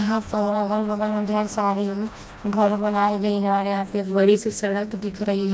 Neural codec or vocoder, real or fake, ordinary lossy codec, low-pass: codec, 16 kHz, 1 kbps, FreqCodec, smaller model; fake; none; none